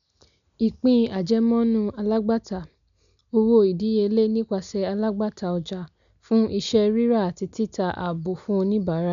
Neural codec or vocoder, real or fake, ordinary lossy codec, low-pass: none; real; none; 7.2 kHz